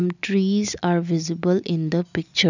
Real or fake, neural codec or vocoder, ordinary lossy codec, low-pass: real; none; none; 7.2 kHz